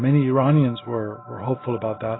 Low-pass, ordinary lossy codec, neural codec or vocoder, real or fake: 7.2 kHz; AAC, 16 kbps; none; real